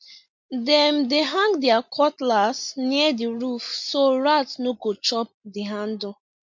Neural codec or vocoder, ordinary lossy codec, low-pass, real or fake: none; MP3, 48 kbps; 7.2 kHz; real